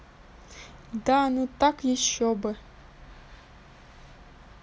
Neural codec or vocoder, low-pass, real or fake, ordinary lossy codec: none; none; real; none